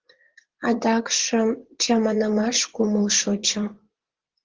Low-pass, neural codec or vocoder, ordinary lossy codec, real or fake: 7.2 kHz; vocoder, 24 kHz, 100 mel bands, Vocos; Opus, 16 kbps; fake